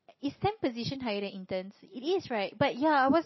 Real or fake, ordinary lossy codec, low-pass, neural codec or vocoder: real; MP3, 24 kbps; 7.2 kHz; none